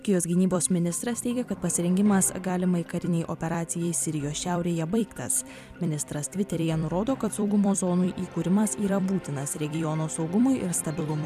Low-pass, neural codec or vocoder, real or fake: 14.4 kHz; vocoder, 44.1 kHz, 128 mel bands every 256 samples, BigVGAN v2; fake